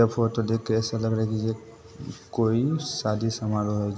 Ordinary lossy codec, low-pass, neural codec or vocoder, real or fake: none; none; none; real